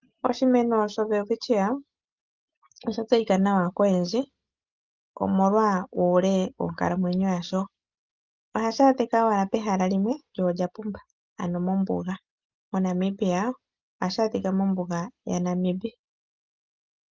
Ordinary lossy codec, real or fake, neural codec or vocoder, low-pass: Opus, 32 kbps; real; none; 7.2 kHz